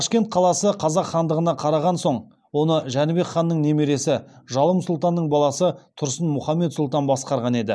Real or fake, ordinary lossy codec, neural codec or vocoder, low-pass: real; none; none; none